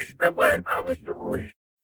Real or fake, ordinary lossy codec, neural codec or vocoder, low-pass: fake; none; codec, 44.1 kHz, 0.9 kbps, DAC; none